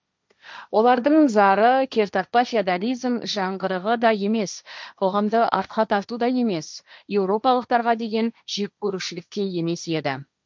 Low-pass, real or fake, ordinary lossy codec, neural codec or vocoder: none; fake; none; codec, 16 kHz, 1.1 kbps, Voila-Tokenizer